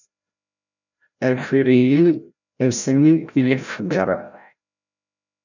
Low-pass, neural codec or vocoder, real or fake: 7.2 kHz; codec, 16 kHz, 0.5 kbps, FreqCodec, larger model; fake